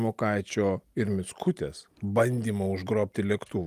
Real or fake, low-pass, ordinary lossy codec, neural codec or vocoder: real; 14.4 kHz; Opus, 32 kbps; none